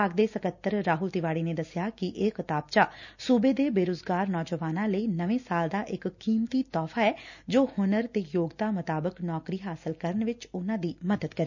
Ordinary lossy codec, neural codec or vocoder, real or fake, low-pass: none; none; real; 7.2 kHz